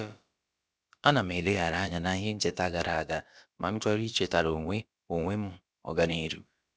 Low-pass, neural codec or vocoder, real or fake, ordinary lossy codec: none; codec, 16 kHz, about 1 kbps, DyCAST, with the encoder's durations; fake; none